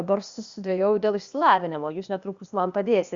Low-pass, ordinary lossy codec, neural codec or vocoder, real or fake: 7.2 kHz; Opus, 64 kbps; codec, 16 kHz, 0.8 kbps, ZipCodec; fake